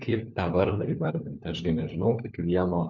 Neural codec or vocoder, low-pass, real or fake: codec, 16 kHz, 4 kbps, FunCodec, trained on LibriTTS, 50 frames a second; 7.2 kHz; fake